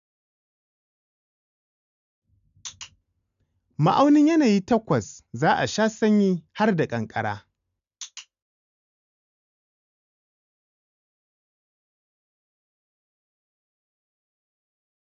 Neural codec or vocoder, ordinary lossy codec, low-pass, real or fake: none; none; 7.2 kHz; real